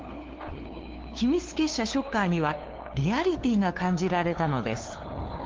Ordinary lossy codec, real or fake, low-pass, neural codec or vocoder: Opus, 16 kbps; fake; 7.2 kHz; codec, 16 kHz, 2 kbps, FunCodec, trained on LibriTTS, 25 frames a second